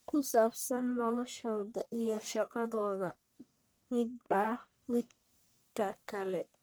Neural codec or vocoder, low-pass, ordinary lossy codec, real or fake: codec, 44.1 kHz, 1.7 kbps, Pupu-Codec; none; none; fake